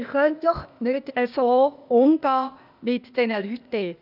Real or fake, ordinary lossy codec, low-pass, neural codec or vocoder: fake; none; 5.4 kHz; codec, 16 kHz, 0.8 kbps, ZipCodec